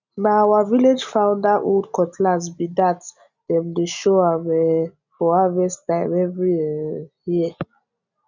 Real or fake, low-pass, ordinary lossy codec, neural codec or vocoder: real; 7.2 kHz; none; none